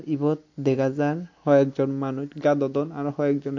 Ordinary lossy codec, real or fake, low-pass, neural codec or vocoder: AAC, 48 kbps; real; 7.2 kHz; none